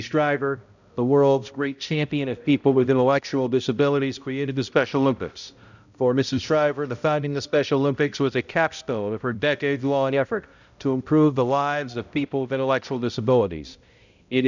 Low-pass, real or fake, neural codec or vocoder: 7.2 kHz; fake; codec, 16 kHz, 0.5 kbps, X-Codec, HuBERT features, trained on balanced general audio